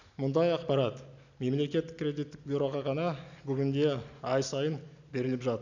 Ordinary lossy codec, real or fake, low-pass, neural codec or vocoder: none; real; 7.2 kHz; none